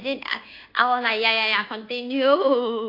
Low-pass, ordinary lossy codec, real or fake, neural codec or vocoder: 5.4 kHz; AAC, 32 kbps; fake; codec, 24 kHz, 1.2 kbps, DualCodec